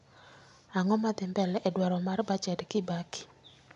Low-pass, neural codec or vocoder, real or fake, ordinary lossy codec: 10.8 kHz; none; real; none